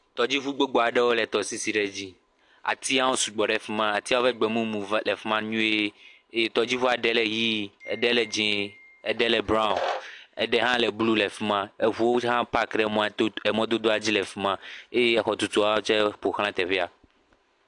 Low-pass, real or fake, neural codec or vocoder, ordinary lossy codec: 10.8 kHz; real; none; AAC, 64 kbps